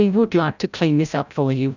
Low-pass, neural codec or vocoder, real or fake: 7.2 kHz; codec, 16 kHz, 0.5 kbps, FreqCodec, larger model; fake